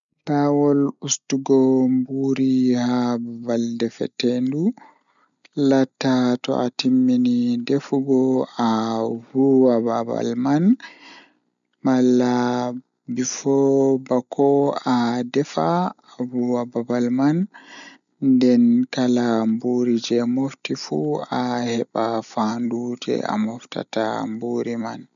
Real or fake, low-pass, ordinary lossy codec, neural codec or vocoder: real; 7.2 kHz; none; none